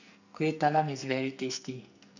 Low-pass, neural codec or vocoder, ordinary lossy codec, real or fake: 7.2 kHz; codec, 44.1 kHz, 2.6 kbps, SNAC; none; fake